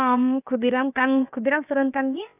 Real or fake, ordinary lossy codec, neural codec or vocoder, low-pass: fake; none; codec, 16 kHz, about 1 kbps, DyCAST, with the encoder's durations; 3.6 kHz